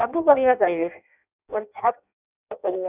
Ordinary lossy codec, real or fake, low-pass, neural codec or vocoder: none; fake; 3.6 kHz; codec, 16 kHz in and 24 kHz out, 0.6 kbps, FireRedTTS-2 codec